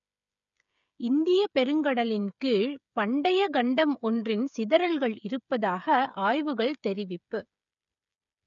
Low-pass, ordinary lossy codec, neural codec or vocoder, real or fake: 7.2 kHz; none; codec, 16 kHz, 8 kbps, FreqCodec, smaller model; fake